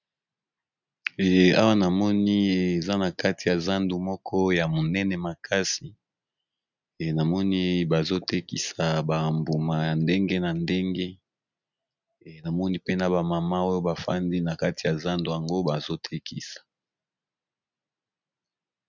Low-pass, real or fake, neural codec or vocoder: 7.2 kHz; real; none